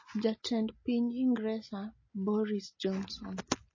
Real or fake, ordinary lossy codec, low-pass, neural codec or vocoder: real; MP3, 32 kbps; 7.2 kHz; none